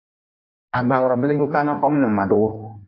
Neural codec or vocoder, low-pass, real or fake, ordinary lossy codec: codec, 16 kHz in and 24 kHz out, 1.1 kbps, FireRedTTS-2 codec; 5.4 kHz; fake; AAC, 32 kbps